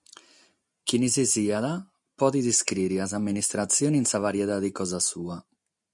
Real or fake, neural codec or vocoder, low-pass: real; none; 10.8 kHz